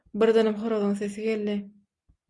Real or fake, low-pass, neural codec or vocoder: real; 10.8 kHz; none